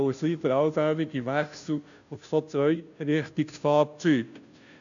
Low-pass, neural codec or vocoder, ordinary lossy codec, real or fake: 7.2 kHz; codec, 16 kHz, 0.5 kbps, FunCodec, trained on Chinese and English, 25 frames a second; none; fake